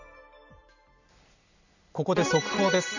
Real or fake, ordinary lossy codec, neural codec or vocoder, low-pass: real; none; none; 7.2 kHz